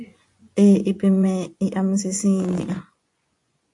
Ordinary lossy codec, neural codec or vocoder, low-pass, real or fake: AAC, 64 kbps; vocoder, 24 kHz, 100 mel bands, Vocos; 10.8 kHz; fake